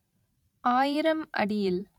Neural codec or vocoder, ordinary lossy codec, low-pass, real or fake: vocoder, 44.1 kHz, 128 mel bands every 512 samples, BigVGAN v2; none; 19.8 kHz; fake